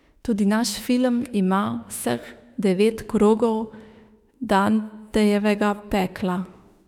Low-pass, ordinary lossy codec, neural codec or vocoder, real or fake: 19.8 kHz; none; autoencoder, 48 kHz, 32 numbers a frame, DAC-VAE, trained on Japanese speech; fake